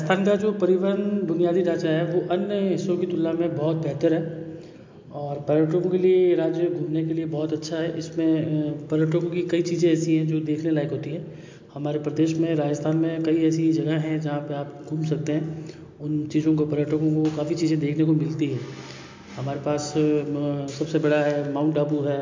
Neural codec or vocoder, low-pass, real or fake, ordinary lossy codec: none; 7.2 kHz; real; MP3, 48 kbps